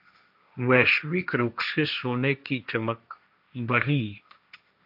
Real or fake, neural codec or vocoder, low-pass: fake; codec, 16 kHz, 1.1 kbps, Voila-Tokenizer; 5.4 kHz